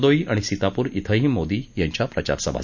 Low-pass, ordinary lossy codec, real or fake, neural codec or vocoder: 7.2 kHz; none; real; none